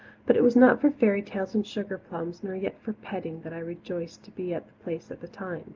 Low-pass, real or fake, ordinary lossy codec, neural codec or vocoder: 7.2 kHz; real; Opus, 24 kbps; none